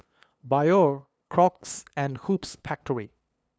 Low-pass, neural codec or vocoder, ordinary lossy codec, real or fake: none; codec, 16 kHz, 2 kbps, FunCodec, trained on LibriTTS, 25 frames a second; none; fake